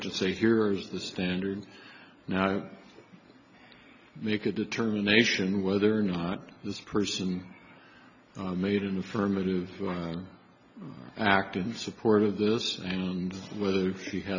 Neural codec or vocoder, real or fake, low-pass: none; real; 7.2 kHz